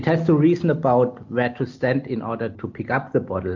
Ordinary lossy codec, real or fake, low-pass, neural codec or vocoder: MP3, 48 kbps; real; 7.2 kHz; none